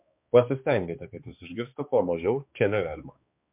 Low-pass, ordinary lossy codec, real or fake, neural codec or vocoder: 3.6 kHz; MP3, 32 kbps; fake; codec, 16 kHz, 2 kbps, X-Codec, HuBERT features, trained on balanced general audio